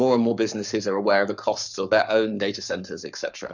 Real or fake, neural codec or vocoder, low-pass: fake; codec, 44.1 kHz, 7.8 kbps, Pupu-Codec; 7.2 kHz